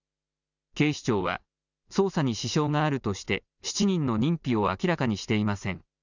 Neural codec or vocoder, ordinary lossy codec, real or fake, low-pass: none; none; real; 7.2 kHz